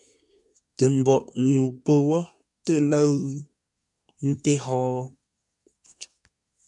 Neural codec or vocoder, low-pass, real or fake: codec, 24 kHz, 1 kbps, SNAC; 10.8 kHz; fake